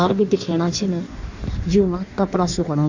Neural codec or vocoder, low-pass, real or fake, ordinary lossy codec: codec, 16 kHz in and 24 kHz out, 1.1 kbps, FireRedTTS-2 codec; 7.2 kHz; fake; Opus, 64 kbps